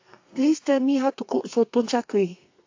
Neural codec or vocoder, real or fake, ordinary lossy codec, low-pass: codec, 32 kHz, 1.9 kbps, SNAC; fake; AAC, 48 kbps; 7.2 kHz